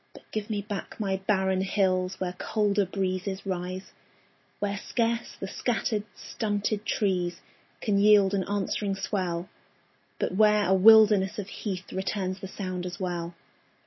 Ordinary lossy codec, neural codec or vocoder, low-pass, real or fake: MP3, 24 kbps; none; 7.2 kHz; real